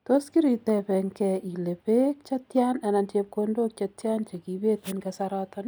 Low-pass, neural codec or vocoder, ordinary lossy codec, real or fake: none; none; none; real